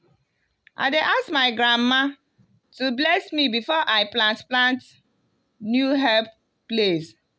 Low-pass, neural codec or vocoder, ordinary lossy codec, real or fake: none; none; none; real